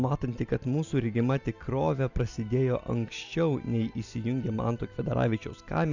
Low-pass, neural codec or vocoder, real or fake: 7.2 kHz; none; real